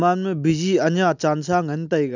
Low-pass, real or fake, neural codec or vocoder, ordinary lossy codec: 7.2 kHz; real; none; none